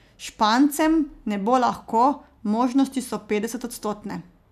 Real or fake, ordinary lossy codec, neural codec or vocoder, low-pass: real; none; none; 14.4 kHz